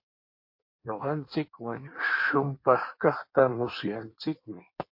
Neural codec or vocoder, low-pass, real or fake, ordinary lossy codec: codec, 16 kHz in and 24 kHz out, 1.1 kbps, FireRedTTS-2 codec; 5.4 kHz; fake; MP3, 32 kbps